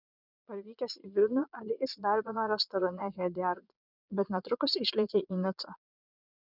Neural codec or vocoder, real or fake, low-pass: vocoder, 22.05 kHz, 80 mel bands, Vocos; fake; 5.4 kHz